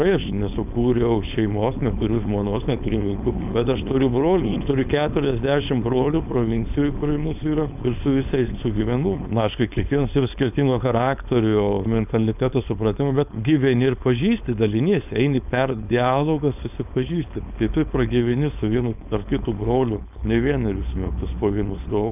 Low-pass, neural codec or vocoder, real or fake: 3.6 kHz; codec, 16 kHz, 4.8 kbps, FACodec; fake